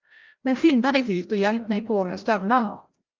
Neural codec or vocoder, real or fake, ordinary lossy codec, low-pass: codec, 16 kHz, 0.5 kbps, FreqCodec, larger model; fake; Opus, 24 kbps; 7.2 kHz